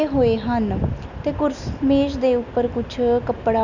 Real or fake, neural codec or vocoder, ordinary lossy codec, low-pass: real; none; none; 7.2 kHz